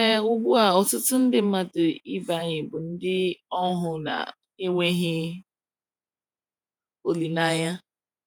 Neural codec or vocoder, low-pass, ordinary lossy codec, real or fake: vocoder, 48 kHz, 128 mel bands, Vocos; none; none; fake